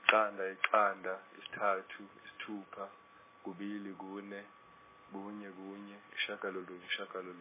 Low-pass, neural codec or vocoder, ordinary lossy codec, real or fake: 3.6 kHz; none; MP3, 16 kbps; real